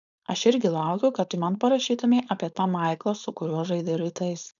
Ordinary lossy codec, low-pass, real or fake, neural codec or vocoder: MP3, 96 kbps; 7.2 kHz; fake; codec, 16 kHz, 4.8 kbps, FACodec